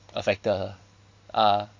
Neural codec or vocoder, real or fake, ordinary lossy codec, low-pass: none; real; MP3, 64 kbps; 7.2 kHz